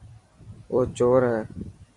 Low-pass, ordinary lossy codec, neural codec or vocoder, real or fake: 10.8 kHz; AAC, 48 kbps; vocoder, 44.1 kHz, 128 mel bands every 256 samples, BigVGAN v2; fake